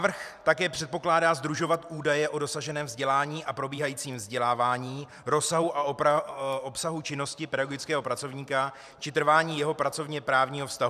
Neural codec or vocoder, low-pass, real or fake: vocoder, 44.1 kHz, 128 mel bands every 512 samples, BigVGAN v2; 14.4 kHz; fake